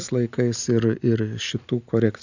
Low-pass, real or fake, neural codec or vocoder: 7.2 kHz; real; none